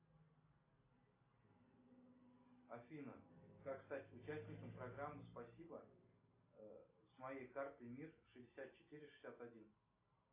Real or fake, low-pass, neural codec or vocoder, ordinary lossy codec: real; 3.6 kHz; none; AAC, 24 kbps